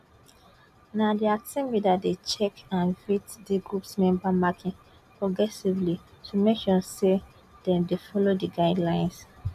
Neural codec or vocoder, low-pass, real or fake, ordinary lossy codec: none; 14.4 kHz; real; none